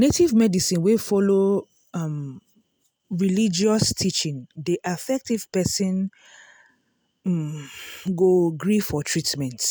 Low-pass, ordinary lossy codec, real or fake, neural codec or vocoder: none; none; real; none